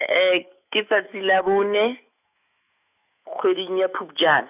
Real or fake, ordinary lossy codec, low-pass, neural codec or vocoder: fake; none; 3.6 kHz; autoencoder, 48 kHz, 128 numbers a frame, DAC-VAE, trained on Japanese speech